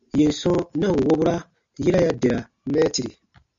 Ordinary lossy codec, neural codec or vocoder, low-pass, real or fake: MP3, 64 kbps; none; 7.2 kHz; real